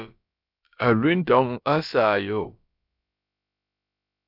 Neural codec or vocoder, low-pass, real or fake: codec, 16 kHz, about 1 kbps, DyCAST, with the encoder's durations; 5.4 kHz; fake